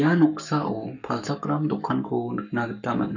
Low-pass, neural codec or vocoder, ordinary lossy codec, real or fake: 7.2 kHz; codec, 44.1 kHz, 7.8 kbps, Pupu-Codec; none; fake